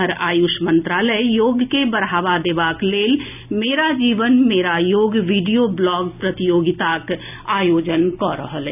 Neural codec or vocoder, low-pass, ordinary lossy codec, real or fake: none; 3.6 kHz; none; real